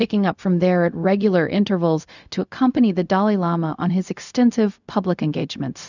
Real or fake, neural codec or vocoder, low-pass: fake; codec, 16 kHz, 0.4 kbps, LongCat-Audio-Codec; 7.2 kHz